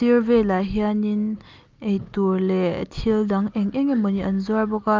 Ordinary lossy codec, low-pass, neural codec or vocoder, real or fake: Opus, 24 kbps; 7.2 kHz; none; real